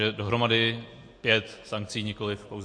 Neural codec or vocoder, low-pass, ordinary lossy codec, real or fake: none; 9.9 kHz; MP3, 48 kbps; real